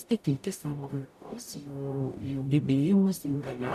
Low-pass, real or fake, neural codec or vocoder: 14.4 kHz; fake; codec, 44.1 kHz, 0.9 kbps, DAC